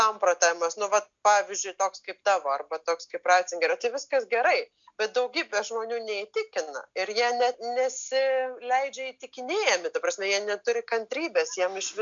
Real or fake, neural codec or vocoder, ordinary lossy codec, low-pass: real; none; MP3, 64 kbps; 7.2 kHz